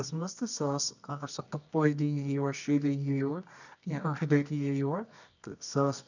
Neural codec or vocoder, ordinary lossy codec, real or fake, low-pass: codec, 24 kHz, 0.9 kbps, WavTokenizer, medium music audio release; none; fake; 7.2 kHz